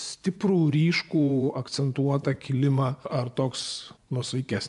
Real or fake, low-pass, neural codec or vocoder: fake; 10.8 kHz; vocoder, 24 kHz, 100 mel bands, Vocos